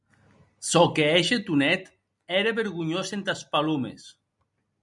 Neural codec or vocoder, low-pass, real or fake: none; 10.8 kHz; real